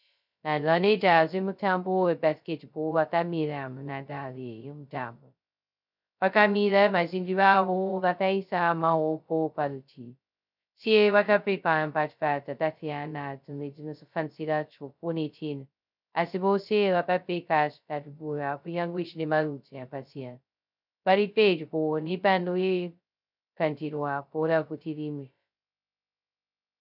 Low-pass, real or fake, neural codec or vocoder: 5.4 kHz; fake; codec, 16 kHz, 0.2 kbps, FocalCodec